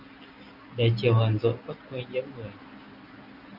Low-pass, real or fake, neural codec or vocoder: 5.4 kHz; real; none